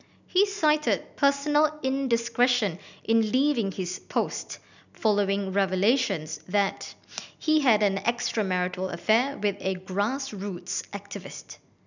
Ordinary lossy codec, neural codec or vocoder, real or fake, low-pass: none; none; real; 7.2 kHz